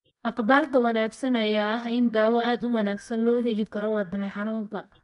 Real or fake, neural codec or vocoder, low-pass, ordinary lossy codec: fake; codec, 24 kHz, 0.9 kbps, WavTokenizer, medium music audio release; 10.8 kHz; MP3, 96 kbps